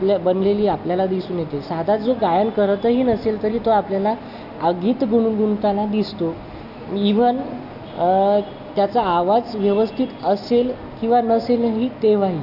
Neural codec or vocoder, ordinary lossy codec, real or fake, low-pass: none; none; real; 5.4 kHz